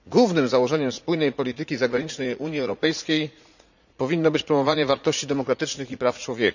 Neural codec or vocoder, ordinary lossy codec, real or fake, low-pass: vocoder, 44.1 kHz, 80 mel bands, Vocos; none; fake; 7.2 kHz